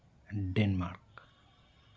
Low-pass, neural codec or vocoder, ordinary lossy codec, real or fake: none; none; none; real